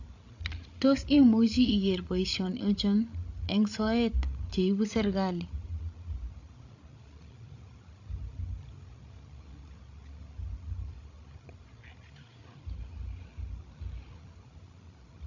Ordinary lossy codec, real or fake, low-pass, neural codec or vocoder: AAC, 48 kbps; fake; 7.2 kHz; codec, 16 kHz, 16 kbps, FreqCodec, larger model